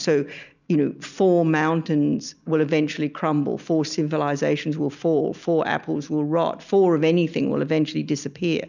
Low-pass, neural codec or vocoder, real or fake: 7.2 kHz; none; real